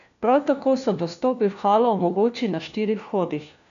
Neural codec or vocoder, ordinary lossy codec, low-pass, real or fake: codec, 16 kHz, 1 kbps, FunCodec, trained on LibriTTS, 50 frames a second; none; 7.2 kHz; fake